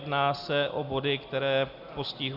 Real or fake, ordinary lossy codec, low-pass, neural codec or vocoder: real; Opus, 64 kbps; 5.4 kHz; none